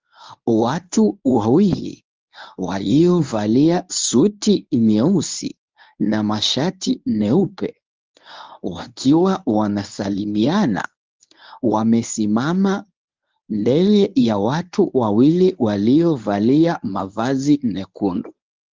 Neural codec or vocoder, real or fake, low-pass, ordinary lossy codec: codec, 24 kHz, 0.9 kbps, WavTokenizer, medium speech release version 1; fake; 7.2 kHz; Opus, 32 kbps